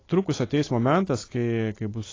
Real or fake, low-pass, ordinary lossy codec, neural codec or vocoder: real; 7.2 kHz; AAC, 32 kbps; none